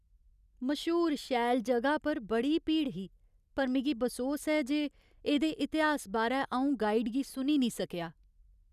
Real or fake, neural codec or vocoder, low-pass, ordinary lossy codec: real; none; 14.4 kHz; none